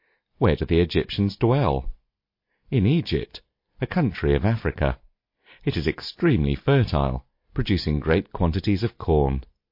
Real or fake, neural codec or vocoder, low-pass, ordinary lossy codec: real; none; 5.4 kHz; MP3, 32 kbps